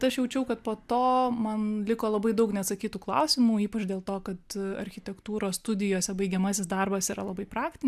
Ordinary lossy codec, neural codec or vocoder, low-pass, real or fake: AAC, 96 kbps; none; 14.4 kHz; real